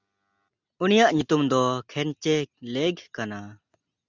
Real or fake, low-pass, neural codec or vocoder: real; 7.2 kHz; none